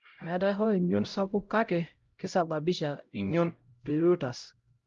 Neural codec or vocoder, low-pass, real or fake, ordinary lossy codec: codec, 16 kHz, 0.5 kbps, X-Codec, HuBERT features, trained on LibriSpeech; 7.2 kHz; fake; Opus, 32 kbps